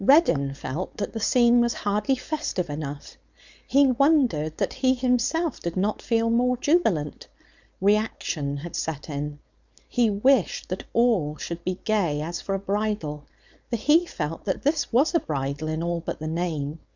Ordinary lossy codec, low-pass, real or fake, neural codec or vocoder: Opus, 64 kbps; 7.2 kHz; fake; codec, 16 kHz, 4.8 kbps, FACodec